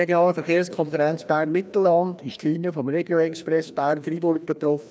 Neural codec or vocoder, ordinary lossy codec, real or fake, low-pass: codec, 16 kHz, 1 kbps, FreqCodec, larger model; none; fake; none